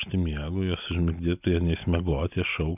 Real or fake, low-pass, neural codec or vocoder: real; 3.6 kHz; none